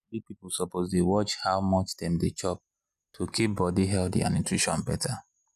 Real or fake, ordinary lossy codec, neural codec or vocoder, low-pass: real; none; none; 14.4 kHz